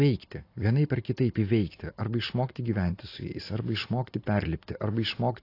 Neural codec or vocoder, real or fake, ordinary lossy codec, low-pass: none; real; AAC, 32 kbps; 5.4 kHz